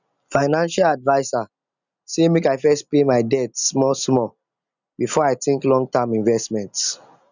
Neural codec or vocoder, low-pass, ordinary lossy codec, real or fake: none; 7.2 kHz; none; real